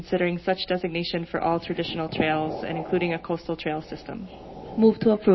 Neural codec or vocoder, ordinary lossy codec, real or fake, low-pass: none; MP3, 24 kbps; real; 7.2 kHz